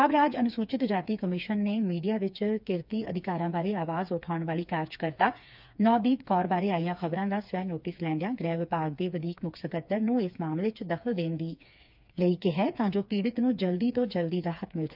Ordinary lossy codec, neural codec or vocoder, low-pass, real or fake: none; codec, 16 kHz, 4 kbps, FreqCodec, smaller model; 5.4 kHz; fake